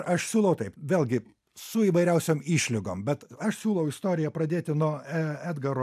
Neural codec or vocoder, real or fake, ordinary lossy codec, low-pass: none; real; AAC, 96 kbps; 14.4 kHz